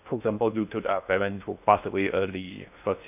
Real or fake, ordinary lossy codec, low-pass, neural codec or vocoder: fake; none; 3.6 kHz; codec, 16 kHz in and 24 kHz out, 0.6 kbps, FocalCodec, streaming, 2048 codes